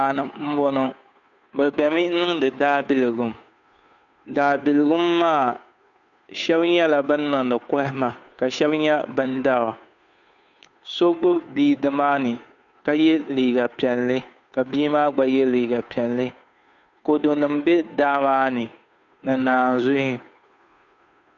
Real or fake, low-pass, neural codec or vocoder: fake; 7.2 kHz; codec, 16 kHz, 2 kbps, FunCodec, trained on Chinese and English, 25 frames a second